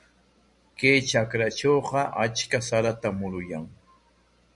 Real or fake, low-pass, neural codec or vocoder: real; 10.8 kHz; none